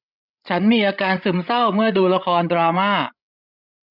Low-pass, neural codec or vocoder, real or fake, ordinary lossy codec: 5.4 kHz; codec, 16 kHz, 16 kbps, FreqCodec, larger model; fake; none